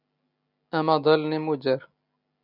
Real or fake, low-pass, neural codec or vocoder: real; 5.4 kHz; none